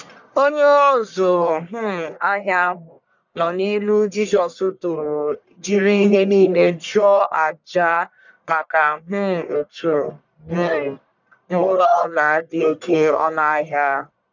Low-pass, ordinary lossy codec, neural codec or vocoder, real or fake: 7.2 kHz; none; codec, 44.1 kHz, 1.7 kbps, Pupu-Codec; fake